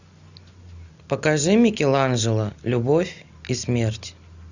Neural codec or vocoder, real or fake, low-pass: none; real; 7.2 kHz